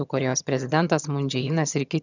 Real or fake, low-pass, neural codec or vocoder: fake; 7.2 kHz; vocoder, 22.05 kHz, 80 mel bands, HiFi-GAN